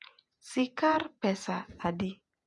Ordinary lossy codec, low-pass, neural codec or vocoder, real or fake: none; 10.8 kHz; vocoder, 48 kHz, 128 mel bands, Vocos; fake